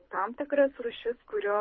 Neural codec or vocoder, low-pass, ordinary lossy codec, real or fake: codec, 24 kHz, 6 kbps, HILCodec; 7.2 kHz; MP3, 24 kbps; fake